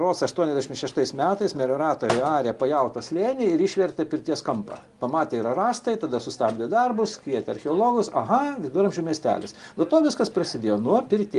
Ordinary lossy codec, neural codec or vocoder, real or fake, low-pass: Opus, 24 kbps; none; real; 10.8 kHz